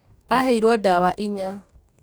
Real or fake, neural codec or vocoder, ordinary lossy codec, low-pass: fake; codec, 44.1 kHz, 2.6 kbps, DAC; none; none